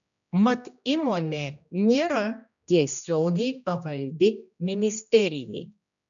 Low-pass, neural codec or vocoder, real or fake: 7.2 kHz; codec, 16 kHz, 1 kbps, X-Codec, HuBERT features, trained on general audio; fake